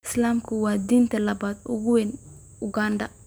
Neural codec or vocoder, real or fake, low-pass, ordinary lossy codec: none; real; none; none